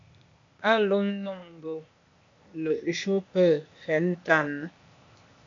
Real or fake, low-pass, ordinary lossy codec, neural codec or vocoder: fake; 7.2 kHz; MP3, 64 kbps; codec, 16 kHz, 0.8 kbps, ZipCodec